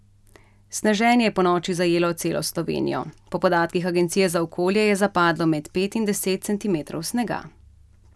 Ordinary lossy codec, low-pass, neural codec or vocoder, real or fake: none; none; none; real